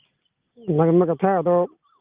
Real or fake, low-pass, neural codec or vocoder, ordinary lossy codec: real; 3.6 kHz; none; Opus, 24 kbps